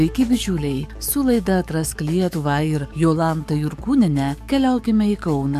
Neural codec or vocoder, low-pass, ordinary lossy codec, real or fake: codec, 44.1 kHz, 7.8 kbps, DAC; 14.4 kHz; MP3, 96 kbps; fake